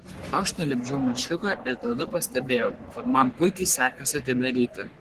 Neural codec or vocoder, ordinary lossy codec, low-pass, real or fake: codec, 44.1 kHz, 3.4 kbps, Pupu-Codec; Opus, 16 kbps; 14.4 kHz; fake